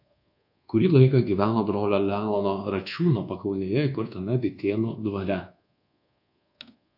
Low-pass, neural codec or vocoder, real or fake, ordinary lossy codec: 5.4 kHz; codec, 24 kHz, 1.2 kbps, DualCodec; fake; AAC, 48 kbps